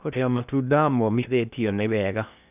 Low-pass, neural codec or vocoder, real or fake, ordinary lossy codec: 3.6 kHz; codec, 16 kHz in and 24 kHz out, 0.6 kbps, FocalCodec, streaming, 2048 codes; fake; none